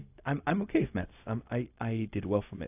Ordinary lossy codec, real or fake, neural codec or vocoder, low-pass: AAC, 32 kbps; fake; codec, 16 kHz, 0.4 kbps, LongCat-Audio-Codec; 3.6 kHz